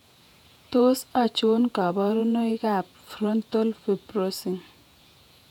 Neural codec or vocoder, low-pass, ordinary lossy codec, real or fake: vocoder, 48 kHz, 128 mel bands, Vocos; 19.8 kHz; none; fake